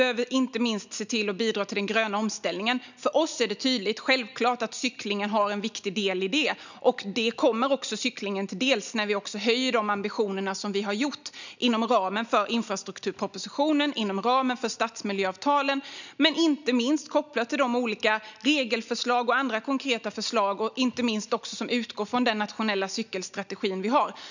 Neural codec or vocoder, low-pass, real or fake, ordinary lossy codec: none; 7.2 kHz; real; none